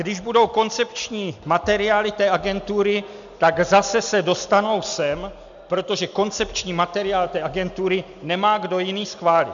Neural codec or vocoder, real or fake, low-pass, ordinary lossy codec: none; real; 7.2 kHz; MP3, 96 kbps